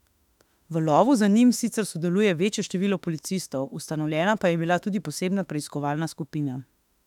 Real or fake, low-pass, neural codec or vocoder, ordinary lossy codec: fake; 19.8 kHz; autoencoder, 48 kHz, 32 numbers a frame, DAC-VAE, trained on Japanese speech; none